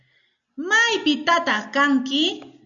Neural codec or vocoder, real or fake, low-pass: none; real; 7.2 kHz